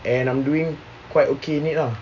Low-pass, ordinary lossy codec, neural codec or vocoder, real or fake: 7.2 kHz; none; none; real